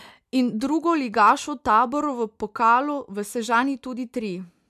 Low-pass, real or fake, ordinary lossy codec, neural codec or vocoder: 14.4 kHz; real; MP3, 96 kbps; none